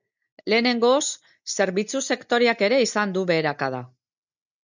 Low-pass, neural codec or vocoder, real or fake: 7.2 kHz; none; real